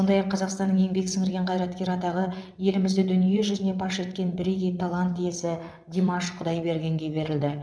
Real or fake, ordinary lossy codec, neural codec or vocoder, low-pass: fake; none; vocoder, 22.05 kHz, 80 mel bands, WaveNeXt; none